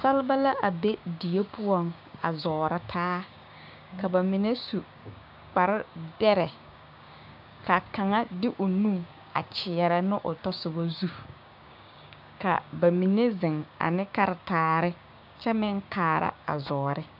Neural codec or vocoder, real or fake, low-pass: codec, 16 kHz, 6 kbps, DAC; fake; 5.4 kHz